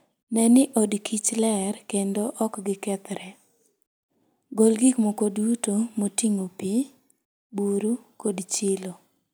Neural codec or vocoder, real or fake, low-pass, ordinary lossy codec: none; real; none; none